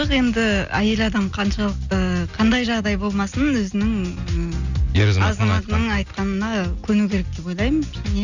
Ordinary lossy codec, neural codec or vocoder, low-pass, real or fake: none; none; 7.2 kHz; real